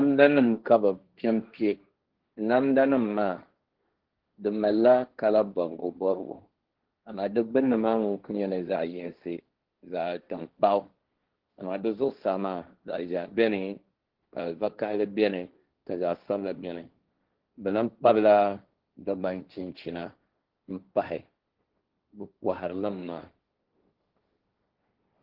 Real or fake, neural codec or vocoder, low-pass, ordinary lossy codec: fake; codec, 16 kHz, 1.1 kbps, Voila-Tokenizer; 5.4 kHz; Opus, 16 kbps